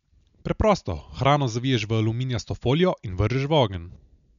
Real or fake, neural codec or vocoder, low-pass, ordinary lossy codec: real; none; 7.2 kHz; none